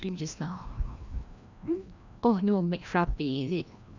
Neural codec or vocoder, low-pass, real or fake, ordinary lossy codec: codec, 16 kHz, 1 kbps, FreqCodec, larger model; 7.2 kHz; fake; none